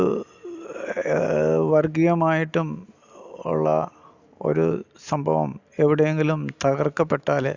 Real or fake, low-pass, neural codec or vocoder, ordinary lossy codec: real; 7.2 kHz; none; Opus, 64 kbps